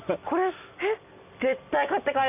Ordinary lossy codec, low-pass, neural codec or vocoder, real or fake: none; 3.6 kHz; none; real